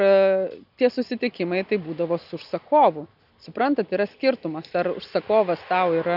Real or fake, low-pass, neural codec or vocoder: real; 5.4 kHz; none